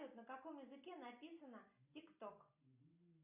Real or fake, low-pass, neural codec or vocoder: real; 3.6 kHz; none